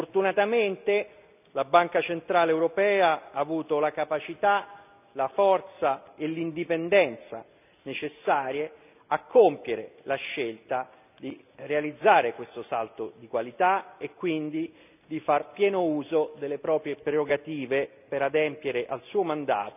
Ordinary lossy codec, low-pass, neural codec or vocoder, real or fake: none; 3.6 kHz; none; real